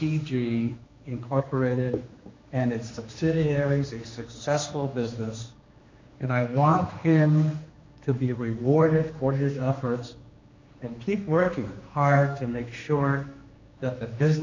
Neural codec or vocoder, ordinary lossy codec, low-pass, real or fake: codec, 16 kHz, 2 kbps, X-Codec, HuBERT features, trained on general audio; MP3, 64 kbps; 7.2 kHz; fake